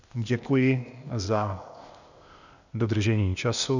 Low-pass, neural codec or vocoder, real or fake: 7.2 kHz; codec, 16 kHz, 0.8 kbps, ZipCodec; fake